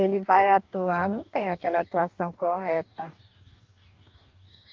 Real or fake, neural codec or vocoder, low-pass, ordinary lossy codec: fake; codec, 44.1 kHz, 2.6 kbps, DAC; 7.2 kHz; Opus, 24 kbps